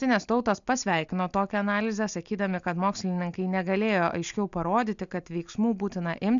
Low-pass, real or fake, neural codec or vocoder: 7.2 kHz; real; none